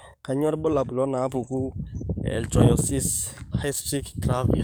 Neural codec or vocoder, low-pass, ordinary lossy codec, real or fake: codec, 44.1 kHz, 7.8 kbps, DAC; none; none; fake